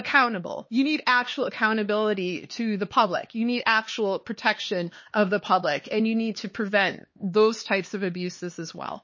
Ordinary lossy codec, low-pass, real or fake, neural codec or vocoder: MP3, 32 kbps; 7.2 kHz; fake; codec, 16 kHz, 4 kbps, X-Codec, HuBERT features, trained on LibriSpeech